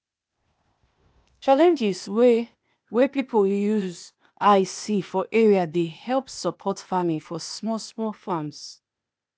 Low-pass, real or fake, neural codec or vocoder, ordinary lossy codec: none; fake; codec, 16 kHz, 0.8 kbps, ZipCodec; none